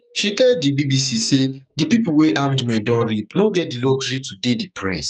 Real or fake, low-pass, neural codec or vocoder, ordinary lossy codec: fake; 10.8 kHz; codec, 44.1 kHz, 2.6 kbps, SNAC; none